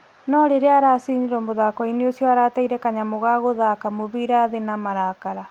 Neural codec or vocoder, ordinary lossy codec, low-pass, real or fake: none; Opus, 32 kbps; 19.8 kHz; real